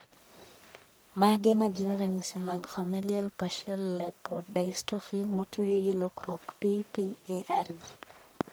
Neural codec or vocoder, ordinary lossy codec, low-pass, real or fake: codec, 44.1 kHz, 1.7 kbps, Pupu-Codec; none; none; fake